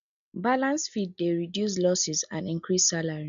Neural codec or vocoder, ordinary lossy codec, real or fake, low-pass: none; none; real; 7.2 kHz